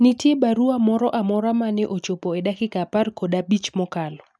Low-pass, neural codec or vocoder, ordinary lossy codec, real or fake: none; none; none; real